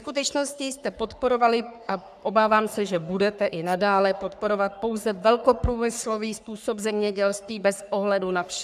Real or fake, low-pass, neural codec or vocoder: fake; 14.4 kHz; codec, 44.1 kHz, 3.4 kbps, Pupu-Codec